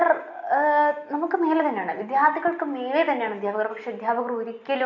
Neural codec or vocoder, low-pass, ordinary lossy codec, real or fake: none; 7.2 kHz; none; real